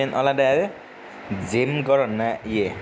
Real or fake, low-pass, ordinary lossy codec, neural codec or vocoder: real; none; none; none